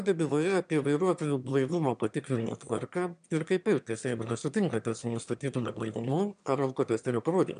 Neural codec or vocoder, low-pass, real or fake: autoencoder, 22.05 kHz, a latent of 192 numbers a frame, VITS, trained on one speaker; 9.9 kHz; fake